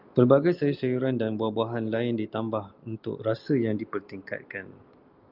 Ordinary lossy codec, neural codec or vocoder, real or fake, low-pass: Opus, 24 kbps; none; real; 5.4 kHz